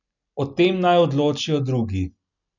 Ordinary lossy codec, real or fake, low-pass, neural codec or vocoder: none; real; 7.2 kHz; none